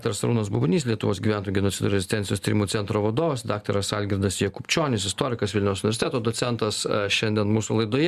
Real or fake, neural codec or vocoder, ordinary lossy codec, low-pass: real; none; Opus, 64 kbps; 14.4 kHz